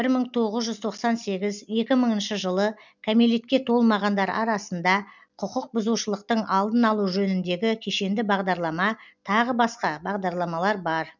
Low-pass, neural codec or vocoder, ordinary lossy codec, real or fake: 7.2 kHz; none; none; real